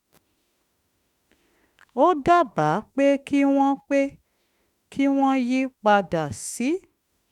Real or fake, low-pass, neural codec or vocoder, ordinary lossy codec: fake; 19.8 kHz; autoencoder, 48 kHz, 32 numbers a frame, DAC-VAE, trained on Japanese speech; none